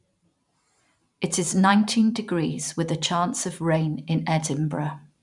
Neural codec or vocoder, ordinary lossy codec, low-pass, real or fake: vocoder, 44.1 kHz, 128 mel bands every 512 samples, BigVGAN v2; none; 10.8 kHz; fake